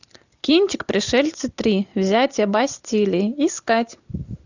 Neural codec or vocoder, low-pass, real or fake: none; 7.2 kHz; real